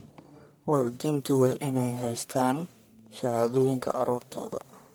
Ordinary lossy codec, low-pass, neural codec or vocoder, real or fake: none; none; codec, 44.1 kHz, 1.7 kbps, Pupu-Codec; fake